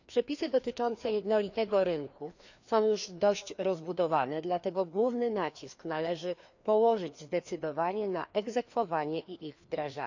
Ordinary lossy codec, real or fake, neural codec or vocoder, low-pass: none; fake; codec, 16 kHz, 2 kbps, FreqCodec, larger model; 7.2 kHz